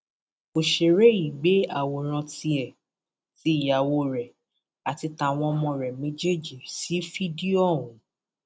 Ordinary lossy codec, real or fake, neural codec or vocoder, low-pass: none; real; none; none